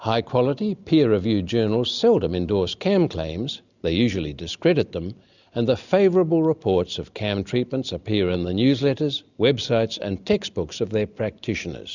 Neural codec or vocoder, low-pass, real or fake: none; 7.2 kHz; real